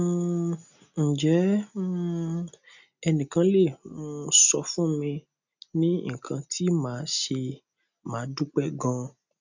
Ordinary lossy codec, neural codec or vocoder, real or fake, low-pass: none; none; real; 7.2 kHz